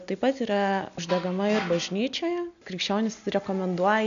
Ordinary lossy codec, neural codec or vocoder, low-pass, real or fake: AAC, 96 kbps; none; 7.2 kHz; real